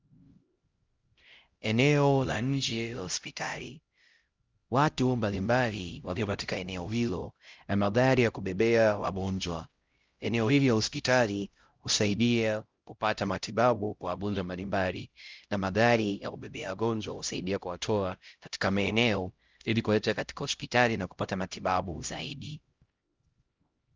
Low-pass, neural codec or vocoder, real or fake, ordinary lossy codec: 7.2 kHz; codec, 16 kHz, 0.5 kbps, X-Codec, HuBERT features, trained on LibriSpeech; fake; Opus, 32 kbps